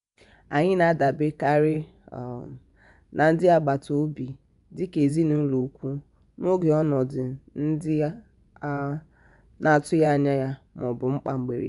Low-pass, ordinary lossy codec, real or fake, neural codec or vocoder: 10.8 kHz; none; fake; vocoder, 24 kHz, 100 mel bands, Vocos